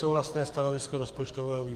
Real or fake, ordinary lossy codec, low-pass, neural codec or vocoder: fake; Opus, 32 kbps; 14.4 kHz; codec, 44.1 kHz, 2.6 kbps, SNAC